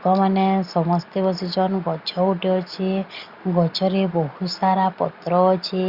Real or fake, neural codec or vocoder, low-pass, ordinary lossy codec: real; none; 5.4 kHz; Opus, 64 kbps